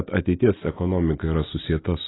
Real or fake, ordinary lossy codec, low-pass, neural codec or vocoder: real; AAC, 16 kbps; 7.2 kHz; none